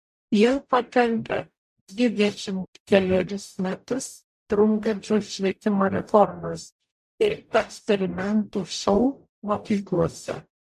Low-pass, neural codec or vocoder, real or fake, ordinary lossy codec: 14.4 kHz; codec, 44.1 kHz, 0.9 kbps, DAC; fake; MP3, 64 kbps